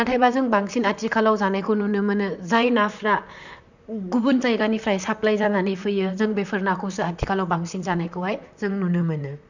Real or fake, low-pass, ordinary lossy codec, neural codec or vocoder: fake; 7.2 kHz; none; vocoder, 44.1 kHz, 128 mel bands, Pupu-Vocoder